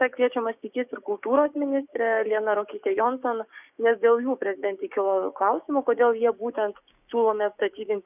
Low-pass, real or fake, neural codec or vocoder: 3.6 kHz; fake; codec, 16 kHz, 6 kbps, DAC